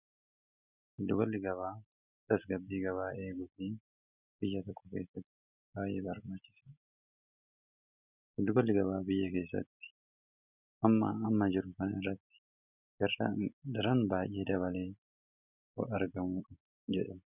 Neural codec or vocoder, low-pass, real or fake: none; 3.6 kHz; real